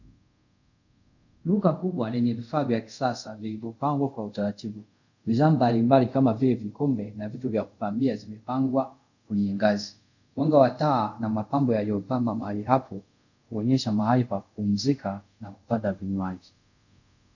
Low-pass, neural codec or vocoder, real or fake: 7.2 kHz; codec, 24 kHz, 0.5 kbps, DualCodec; fake